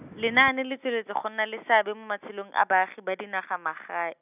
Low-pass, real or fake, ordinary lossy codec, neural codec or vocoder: 3.6 kHz; real; none; none